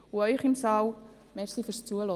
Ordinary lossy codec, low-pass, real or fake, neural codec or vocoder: Opus, 32 kbps; 14.4 kHz; real; none